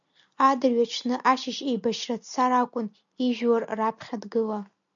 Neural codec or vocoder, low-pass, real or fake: none; 7.2 kHz; real